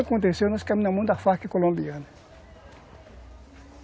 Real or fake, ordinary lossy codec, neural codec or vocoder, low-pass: real; none; none; none